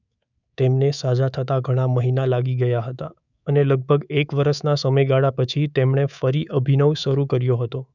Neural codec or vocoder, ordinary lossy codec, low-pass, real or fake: codec, 24 kHz, 3.1 kbps, DualCodec; none; 7.2 kHz; fake